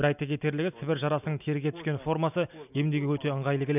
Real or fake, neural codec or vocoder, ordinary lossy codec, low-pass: real; none; none; 3.6 kHz